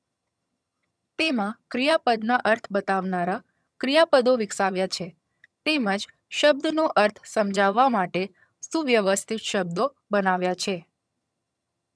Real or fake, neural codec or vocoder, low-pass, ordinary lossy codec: fake; vocoder, 22.05 kHz, 80 mel bands, HiFi-GAN; none; none